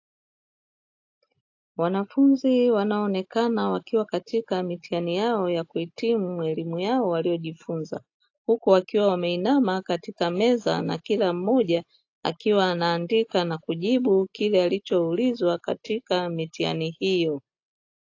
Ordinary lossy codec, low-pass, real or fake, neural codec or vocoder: AAC, 48 kbps; 7.2 kHz; real; none